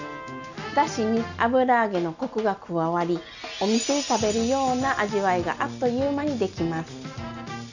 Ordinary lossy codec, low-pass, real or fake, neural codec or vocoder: none; 7.2 kHz; real; none